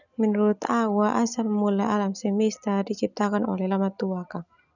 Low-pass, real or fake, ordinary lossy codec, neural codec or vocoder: 7.2 kHz; real; none; none